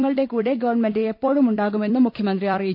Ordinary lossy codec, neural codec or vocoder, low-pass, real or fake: none; vocoder, 44.1 kHz, 128 mel bands every 256 samples, BigVGAN v2; 5.4 kHz; fake